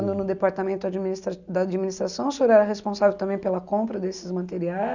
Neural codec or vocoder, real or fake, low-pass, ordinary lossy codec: none; real; 7.2 kHz; none